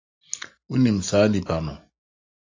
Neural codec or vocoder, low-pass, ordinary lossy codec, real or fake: none; 7.2 kHz; AAC, 48 kbps; real